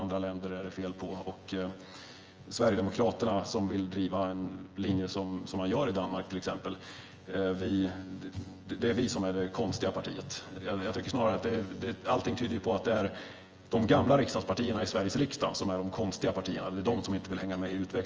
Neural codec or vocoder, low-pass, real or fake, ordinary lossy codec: vocoder, 24 kHz, 100 mel bands, Vocos; 7.2 kHz; fake; Opus, 24 kbps